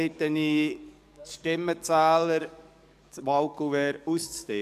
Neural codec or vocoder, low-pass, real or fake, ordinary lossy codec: codec, 44.1 kHz, 7.8 kbps, DAC; 14.4 kHz; fake; MP3, 96 kbps